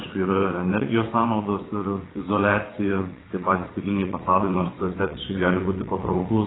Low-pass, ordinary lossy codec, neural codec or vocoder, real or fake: 7.2 kHz; AAC, 16 kbps; vocoder, 22.05 kHz, 80 mel bands, WaveNeXt; fake